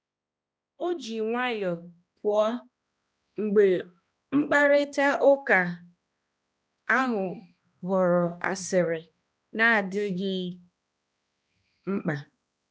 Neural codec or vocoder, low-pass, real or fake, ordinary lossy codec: codec, 16 kHz, 1 kbps, X-Codec, HuBERT features, trained on balanced general audio; none; fake; none